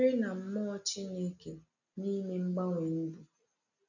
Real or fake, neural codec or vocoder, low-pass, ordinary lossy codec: real; none; 7.2 kHz; none